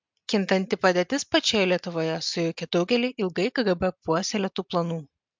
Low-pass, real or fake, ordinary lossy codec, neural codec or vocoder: 7.2 kHz; real; MP3, 64 kbps; none